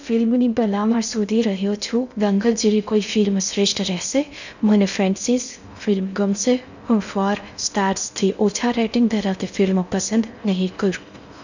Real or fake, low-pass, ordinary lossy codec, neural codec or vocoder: fake; 7.2 kHz; none; codec, 16 kHz in and 24 kHz out, 0.6 kbps, FocalCodec, streaming, 2048 codes